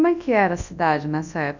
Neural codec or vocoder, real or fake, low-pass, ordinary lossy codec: codec, 24 kHz, 0.9 kbps, WavTokenizer, large speech release; fake; 7.2 kHz; none